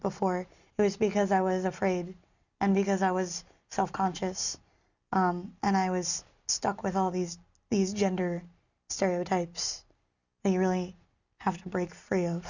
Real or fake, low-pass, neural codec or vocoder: real; 7.2 kHz; none